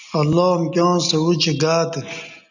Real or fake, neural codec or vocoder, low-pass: real; none; 7.2 kHz